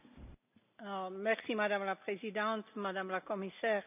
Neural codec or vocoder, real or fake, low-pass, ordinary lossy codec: none; real; 3.6 kHz; none